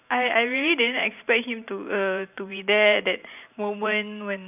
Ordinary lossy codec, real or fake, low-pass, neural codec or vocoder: none; fake; 3.6 kHz; vocoder, 44.1 kHz, 128 mel bands every 512 samples, BigVGAN v2